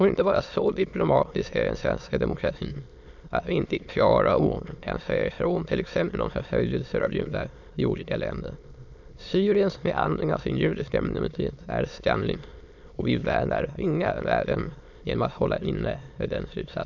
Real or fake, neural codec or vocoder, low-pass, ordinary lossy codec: fake; autoencoder, 22.05 kHz, a latent of 192 numbers a frame, VITS, trained on many speakers; 7.2 kHz; none